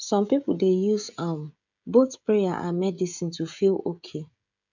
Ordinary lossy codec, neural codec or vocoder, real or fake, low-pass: none; codec, 16 kHz, 16 kbps, FreqCodec, smaller model; fake; 7.2 kHz